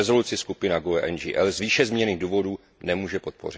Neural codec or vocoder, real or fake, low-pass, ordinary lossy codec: none; real; none; none